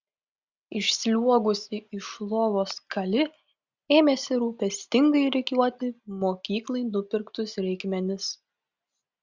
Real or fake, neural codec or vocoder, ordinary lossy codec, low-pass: real; none; Opus, 64 kbps; 7.2 kHz